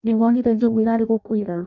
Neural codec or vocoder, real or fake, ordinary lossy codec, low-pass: codec, 16 kHz in and 24 kHz out, 0.6 kbps, FireRedTTS-2 codec; fake; none; 7.2 kHz